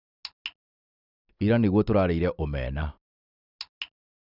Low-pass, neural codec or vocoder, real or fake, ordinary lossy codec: 5.4 kHz; none; real; none